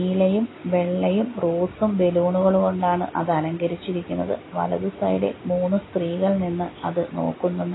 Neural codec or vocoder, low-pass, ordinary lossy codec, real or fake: none; 7.2 kHz; AAC, 16 kbps; real